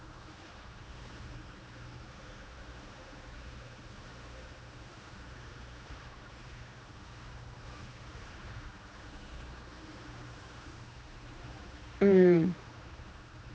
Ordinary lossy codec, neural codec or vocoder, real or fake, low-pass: none; codec, 16 kHz, 2 kbps, X-Codec, HuBERT features, trained on general audio; fake; none